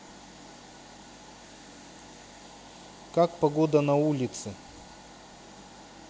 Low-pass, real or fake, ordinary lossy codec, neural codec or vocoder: none; real; none; none